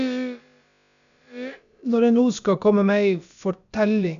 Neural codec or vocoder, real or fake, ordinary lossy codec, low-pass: codec, 16 kHz, about 1 kbps, DyCAST, with the encoder's durations; fake; none; 7.2 kHz